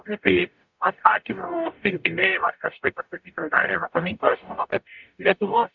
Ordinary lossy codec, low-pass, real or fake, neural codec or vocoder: MP3, 64 kbps; 7.2 kHz; fake; codec, 44.1 kHz, 0.9 kbps, DAC